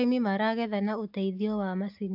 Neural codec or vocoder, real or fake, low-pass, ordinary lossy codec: none; real; 5.4 kHz; AAC, 48 kbps